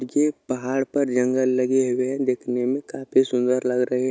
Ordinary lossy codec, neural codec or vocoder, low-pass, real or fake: none; none; none; real